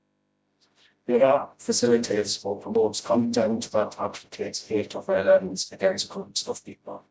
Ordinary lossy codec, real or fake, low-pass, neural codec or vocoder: none; fake; none; codec, 16 kHz, 0.5 kbps, FreqCodec, smaller model